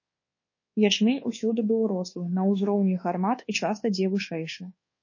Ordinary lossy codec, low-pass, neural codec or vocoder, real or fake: MP3, 32 kbps; 7.2 kHz; codec, 24 kHz, 1.2 kbps, DualCodec; fake